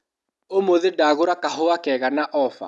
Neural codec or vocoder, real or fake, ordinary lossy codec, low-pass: none; real; none; none